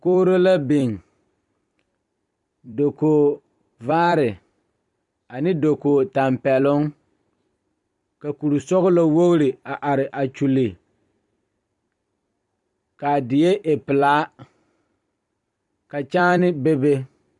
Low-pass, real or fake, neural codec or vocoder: 10.8 kHz; fake; vocoder, 44.1 kHz, 128 mel bands every 256 samples, BigVGAN v2